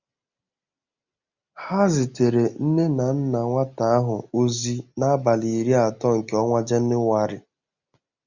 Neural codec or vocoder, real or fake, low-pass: none; real; 7.2 kHz